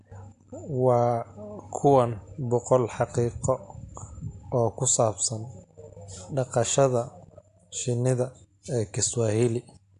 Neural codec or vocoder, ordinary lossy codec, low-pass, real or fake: none; AAC, 64 kbps; 10.8 kHz; real